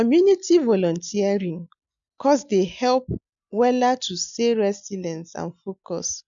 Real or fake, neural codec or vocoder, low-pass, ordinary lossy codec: real; none; 7.2 kHz; none